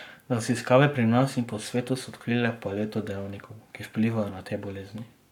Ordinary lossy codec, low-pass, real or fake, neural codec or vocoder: none; 19.8 kHz; fake; codec, 44.1 kHz, 7.8 kbps, Pupu-Codec